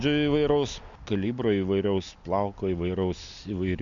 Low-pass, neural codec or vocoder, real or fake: 7.2 kHz; none; real